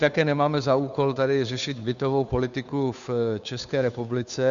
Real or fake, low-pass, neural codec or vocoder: fake; 7.2 kHz; codec, 16 kHz, 2 kbps, FunCodec, trained on Chinese and English, 25 frames a second